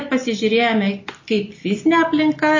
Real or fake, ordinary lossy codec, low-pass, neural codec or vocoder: real; MP3, 32 kbps; 7.2 kHz; none